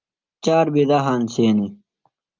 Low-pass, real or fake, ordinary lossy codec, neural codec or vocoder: 7.2 kHz; real; Opus, 24 kbps; none